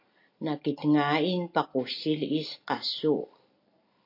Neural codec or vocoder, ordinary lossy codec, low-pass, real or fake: none; AAC, 32 kbps; 5.4 kHz; real